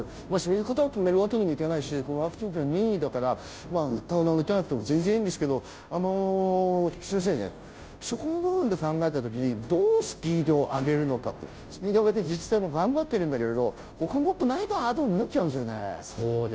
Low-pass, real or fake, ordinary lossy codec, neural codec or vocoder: none; fake; none; codec, 16 kHz, 0.5 kbps, FunCodec, trained on Chinese and English, 25 frames a second